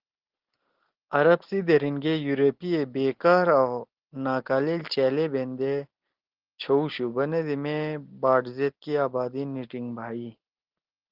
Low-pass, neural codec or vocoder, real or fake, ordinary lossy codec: 5.4 kHz; none; real; Opus, 16 kbps